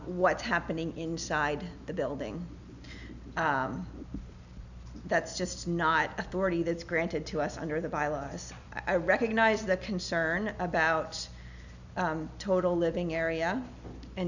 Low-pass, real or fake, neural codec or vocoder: 7.2 kHz; real; none